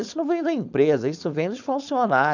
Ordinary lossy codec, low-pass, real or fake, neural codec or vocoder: none; 7.2 kHz; fake; codec, 16 kHz, 4.8 kbps, FACodec